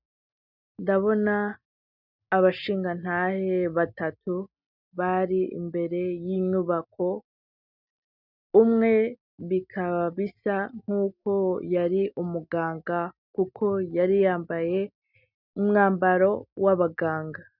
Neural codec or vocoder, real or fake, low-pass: none; real; 5.4 kHz